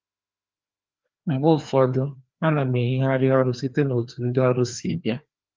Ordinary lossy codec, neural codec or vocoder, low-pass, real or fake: Opus, 32 kbps; codec, 16 kHz, 2 kbps, FreqCodec, larger model; 7.2 kHz; fake